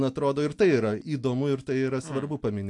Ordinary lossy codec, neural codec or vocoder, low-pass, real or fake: AAC, 64 kbps; none; 10.8 kHz; real